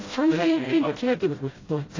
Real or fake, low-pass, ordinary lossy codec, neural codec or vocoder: fake; 7.2 kHz; AAC, 32 kbps; codec, 16 kHz, 0.5 kbps, FreqCodec, smaller model